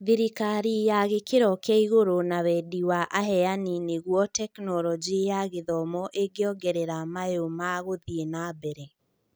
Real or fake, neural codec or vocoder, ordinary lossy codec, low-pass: real; none; none; none